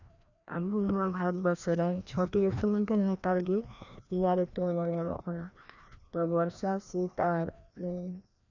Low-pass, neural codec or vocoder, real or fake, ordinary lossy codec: 7.2 kHz; codec, 16 kHz, 1 kbps, FreqCodec, larger model; fake; none